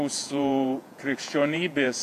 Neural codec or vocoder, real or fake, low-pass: vocoder, 48 kHz, 128 mel bands, Vocos; fake; 14.4 kHz